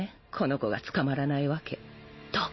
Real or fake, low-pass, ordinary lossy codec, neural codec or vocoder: real; 7.2 kHz; MP3, 24 kbps; none